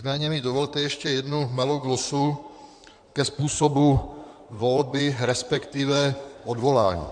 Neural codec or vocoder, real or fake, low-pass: codec, 16 kHz in and 24 kHz out, 2.2 kbps, FireRedTTS-2 codec; fake; 9.9 kHz